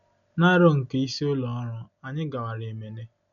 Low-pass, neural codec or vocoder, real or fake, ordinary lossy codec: 7.2 kHz; none; real; none